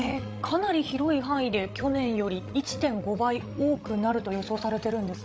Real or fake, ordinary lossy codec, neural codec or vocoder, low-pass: fake; none; codec, 16 kHz, 16 kbps, FreqCodec, larger model; none